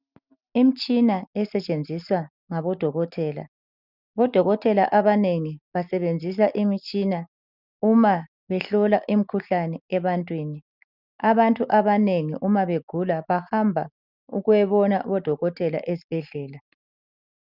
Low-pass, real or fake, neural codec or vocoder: 5.4 kHz; real; none